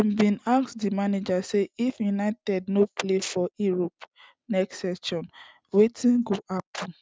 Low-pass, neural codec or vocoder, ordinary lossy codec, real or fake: none; none; none; real